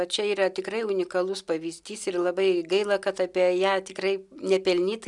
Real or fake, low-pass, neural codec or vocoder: real; 10.8 kHz; none